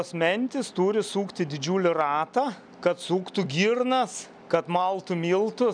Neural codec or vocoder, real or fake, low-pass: none; real; 9.9 kHz